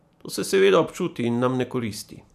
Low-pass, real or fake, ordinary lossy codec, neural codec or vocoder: 14.4 kHz; fake; none; vocoder, 44.1 kHz, 128 mel bands every 512 samples, BigVGAN v2